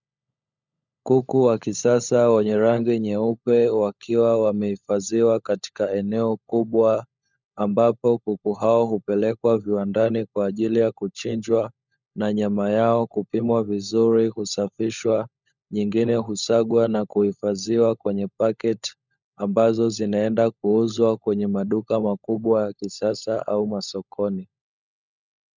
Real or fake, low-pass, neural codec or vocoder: fake; 7.2 kHz; codec, 16 kHz, 16 kbps, FunCodec, trained on LibriTTS, 50 frames a second